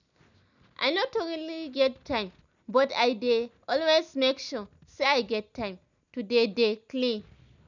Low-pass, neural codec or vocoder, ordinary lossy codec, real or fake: 7.2 kHz; none; none; real